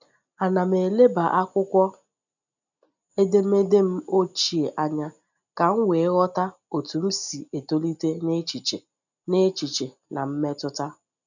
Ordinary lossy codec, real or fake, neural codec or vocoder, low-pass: none; real; none; 7.2 kHz